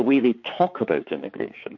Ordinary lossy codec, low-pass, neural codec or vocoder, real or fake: MP3, 48 kbps; 7.2 kHz; codec, 16 kHz, 4 kbps, X-Codec, HuBERT features, trained on balanced general audio; fake